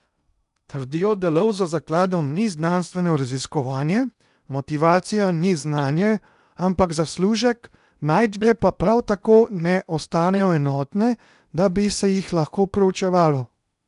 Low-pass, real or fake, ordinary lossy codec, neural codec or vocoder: 10.8 kHz; fake; none; codec, 16 kHz in and 24 kHz out, 0.8 kbps, FocalCodec, streaming, 65536 codes